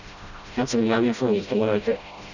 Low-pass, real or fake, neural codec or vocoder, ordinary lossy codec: 7.2 kHz; fake; codec, 16 kHz, 0.5 kbps, FreqCodec, smaller model; none